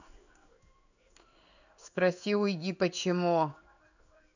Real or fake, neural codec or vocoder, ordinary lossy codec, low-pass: fake; codec, 16 kHz in and 24 kHz out, 1 kbps, XY-Tokenizer; none; 7.2 kHz